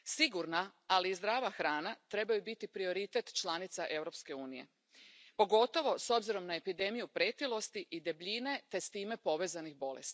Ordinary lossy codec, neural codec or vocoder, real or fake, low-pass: none; none; real; none